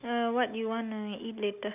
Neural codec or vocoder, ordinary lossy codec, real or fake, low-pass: none; none; real; 3.6 kHz